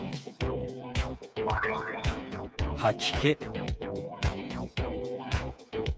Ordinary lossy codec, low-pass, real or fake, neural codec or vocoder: none; none; fake; codec, 16 kHz, 4 kbps, FreqCodec, smaller model